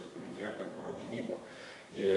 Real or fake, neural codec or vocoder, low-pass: fake; codec, 24 kHz, 1 kbps, SNAC; 10.8 kHz